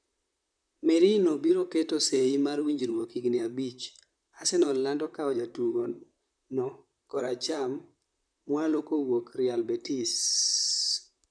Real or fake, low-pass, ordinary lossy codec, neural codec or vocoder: fake; 9.9 kHz; none; vocoder, 44.1 kHz, 128 mel bands, Pupu-Vocoder